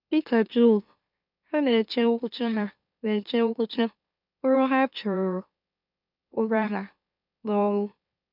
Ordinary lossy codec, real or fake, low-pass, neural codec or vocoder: none; fake; 5.4 kHz; autoencoder, 44.1 kHz, a latent of 192 numbers a frame, MeloTTS